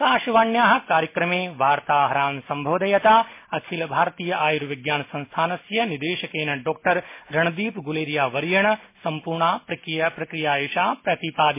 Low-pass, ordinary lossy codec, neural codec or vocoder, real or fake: 3.6 kHz; MP3, 24 kbps; none; real